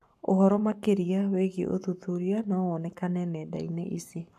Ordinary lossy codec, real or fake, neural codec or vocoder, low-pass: AAC, 96 kbps; fake; codec, 44.1 kHz, 7.8 kbps, Pupu-Codec; 14.4 kHz